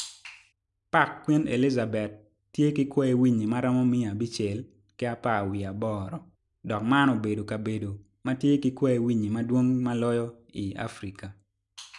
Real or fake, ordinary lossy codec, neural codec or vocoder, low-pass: real; none; none; 10.8 kHz